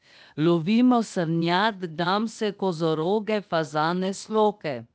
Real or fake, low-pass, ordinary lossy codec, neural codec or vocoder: fake; none; none; codec, 16 kHz, 0.8 kbps, ZipCodec